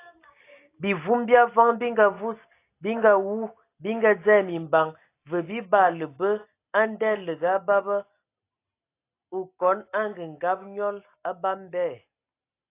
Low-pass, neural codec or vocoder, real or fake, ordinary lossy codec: 3.6 kHz; none; real; AAC, 24 kbps